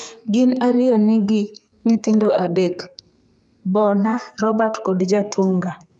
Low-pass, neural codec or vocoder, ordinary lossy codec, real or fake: 10.8 kHz; codec, 32 kHz, 1.9 kbps, SNAC; none; fake